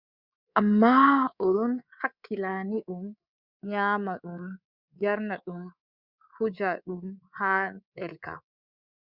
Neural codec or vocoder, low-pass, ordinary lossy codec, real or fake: codec, 24 kHz, 3.1 kbps, DualCodec; 5.4 kHz; Opus, 64 kbps; fake